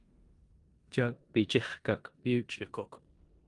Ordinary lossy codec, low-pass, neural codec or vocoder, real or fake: Opus, 32 kbps; 10.8 kHz; codec, 16 kHz in and 24 kHz out, 0.9 kbps, LongCat-Audio-Codec, four codebook decoder; fake